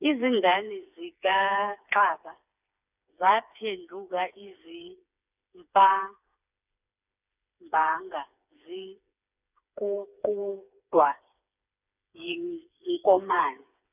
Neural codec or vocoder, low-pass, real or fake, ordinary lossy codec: codec, 16 kHz, 4 kbps, FreqCodec, smaller model; 3.6 kHz; fake; none